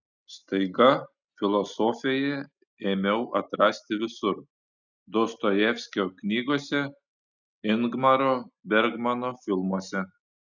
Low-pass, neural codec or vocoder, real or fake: 7.2 kHz; none; real